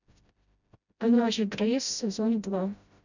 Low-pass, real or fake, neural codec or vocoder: 7.2 kHz; fake; codec, 16 kHz, 0.5 kbps, FreqCodec, smaller model